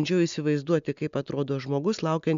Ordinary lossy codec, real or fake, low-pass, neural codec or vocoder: MP3, 64 kbps; real; 7.2 kHz; none